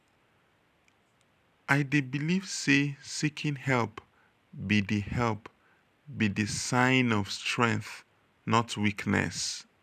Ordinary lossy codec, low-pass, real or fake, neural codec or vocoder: none; 14.4 kHz; real; none